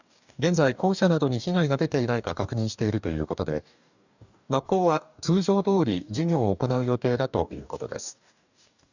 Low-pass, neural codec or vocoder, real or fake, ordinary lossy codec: 7.2 kHz; codec, 44.1 kHz, 2.6 kbps, DAC; fake; none